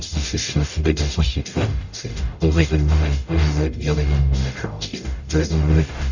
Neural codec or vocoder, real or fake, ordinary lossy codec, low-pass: codec, 44.1 kHz, 0.9 kbps, DAC; fake; none; 7.2 kHz